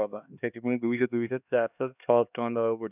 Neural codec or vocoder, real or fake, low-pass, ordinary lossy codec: codec, 16 kHz, 2 kbps, X-Codec, HuBERT features, trained on LibriSpeech; fake; 3.6 kHz; none